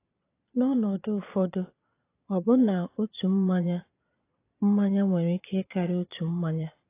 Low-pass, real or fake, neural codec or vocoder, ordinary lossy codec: 3.6 kHz; fake; vocoder, 22.05 kHz, 80 mel bands, Vocos; AAC, 24 kbps